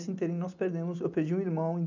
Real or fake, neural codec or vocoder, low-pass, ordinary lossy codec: real; none; 7.2 kHz; none